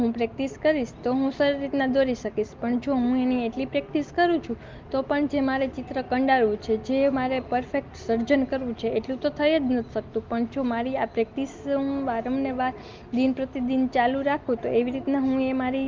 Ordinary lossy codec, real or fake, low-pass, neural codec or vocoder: Opus, 32 kbps; real; 7.2 kHz; none